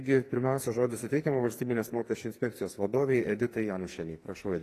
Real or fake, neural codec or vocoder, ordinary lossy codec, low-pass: fake; codec, 44.1 kHz, 2.6 kbps, SNAC; AAC, 48 kbps; 14.4 kHz